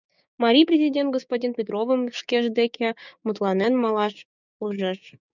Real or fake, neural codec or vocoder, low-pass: fake; codec, 16 kHz, 6 kbps, DAC; 7.2 kHz